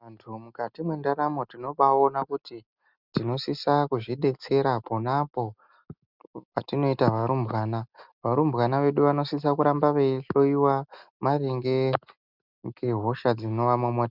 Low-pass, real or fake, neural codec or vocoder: 5.4 kHz; real; none